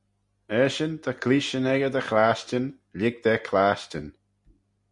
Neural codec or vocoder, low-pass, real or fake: none; 10.8 kHz; real